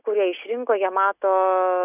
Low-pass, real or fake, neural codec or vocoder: 3.6 kHz; real; none